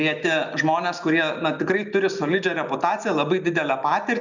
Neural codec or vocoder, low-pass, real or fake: none; 7.2 kHz; real